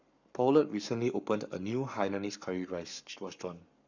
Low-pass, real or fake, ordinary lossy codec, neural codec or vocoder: 7.2 kHz; fake; none; codec, 44.1 kHz, 7.8 kbps, Pupu-Codec